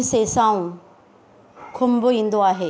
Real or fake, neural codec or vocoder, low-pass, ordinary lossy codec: real; none; none; none